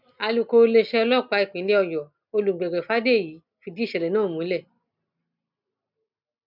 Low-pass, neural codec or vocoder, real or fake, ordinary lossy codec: 5.4 kHz; none; real; none